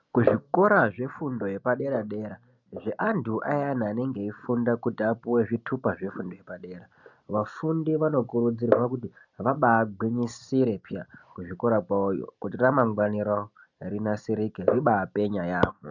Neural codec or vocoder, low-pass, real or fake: none; 7.2 kHz; real